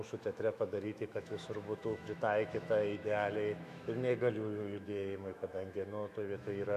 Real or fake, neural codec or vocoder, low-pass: fake; autoencoder, 48 kHz, 128 numbers a frame, DAC-VAE, trained on Japanese speech; 14.4 kHz